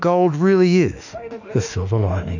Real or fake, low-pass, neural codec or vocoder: fake; 7.2 kHz; autoencoder, 48 kHz, 32 numbers a frame, DAC-VAE, trained on Japanese speech